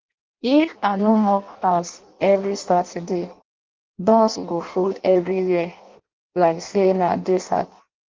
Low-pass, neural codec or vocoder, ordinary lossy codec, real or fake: 7.2 kHz; codec, 16 kHz in and 24 kHz out, 0.6 kbps, FireRedTTS-2 codec; Opus, 32 kbps; fake